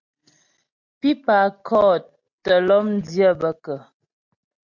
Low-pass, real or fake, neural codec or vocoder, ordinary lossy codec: 7.2 kHz; real; none; MP3, 64 kbps